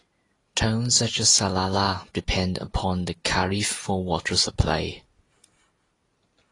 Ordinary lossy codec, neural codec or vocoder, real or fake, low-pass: AAC, 32 kbps; none; real; 10.8 kHz